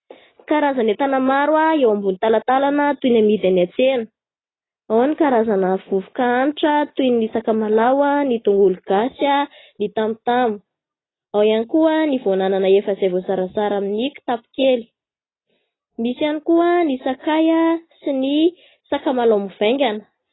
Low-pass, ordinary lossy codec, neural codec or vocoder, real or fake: 7.2 kHz; AAC, 16 kbps; none; real